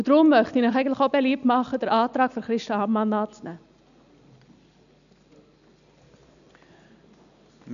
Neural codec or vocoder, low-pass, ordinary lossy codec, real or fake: none; 7.2 kHz; none; real